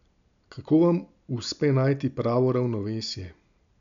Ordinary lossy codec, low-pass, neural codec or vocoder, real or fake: none; 7.2 kHz; none; real